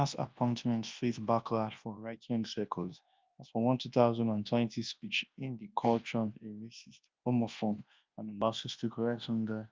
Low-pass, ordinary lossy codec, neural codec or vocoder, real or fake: 7.2 kHz; Opus, 32 kbps; codec, 24 kHz, 0.9 kbps, WavTokenizer, large speech release; fake